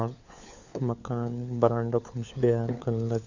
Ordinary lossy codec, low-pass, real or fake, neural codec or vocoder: none; 7.2 kHz; fake; codec, 16 kHz, 4 kbps, FunCodec, trained on LibriTTS, 50 frames a second